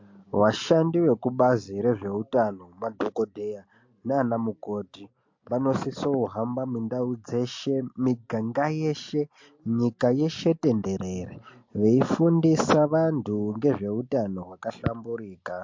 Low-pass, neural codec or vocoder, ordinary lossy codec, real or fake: 7.2 kHz; none; MP3, 48 kbps; real